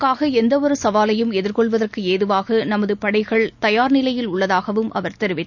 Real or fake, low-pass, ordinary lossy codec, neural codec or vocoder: real; 7.2 kHz; none; none